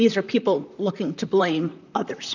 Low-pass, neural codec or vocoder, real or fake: 7.2 kHz; vocoder, 44.1 kHz, 128 mel bands, Pupu-Vocoder; fake